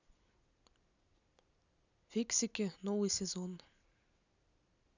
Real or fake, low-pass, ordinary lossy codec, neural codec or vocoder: real; 7.2 kHz; none; none